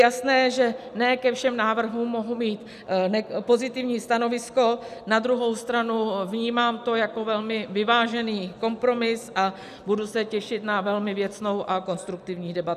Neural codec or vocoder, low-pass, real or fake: none; 14.4 kHz; real